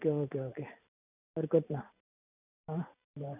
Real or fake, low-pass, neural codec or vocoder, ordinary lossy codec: fake; 3.6 kHz; vocoder, 44.1 kHz, 128 mel bands every 512 samples, BigVGAN v2; none